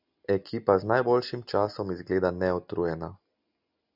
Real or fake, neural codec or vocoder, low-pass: real; none; 5.4 kHz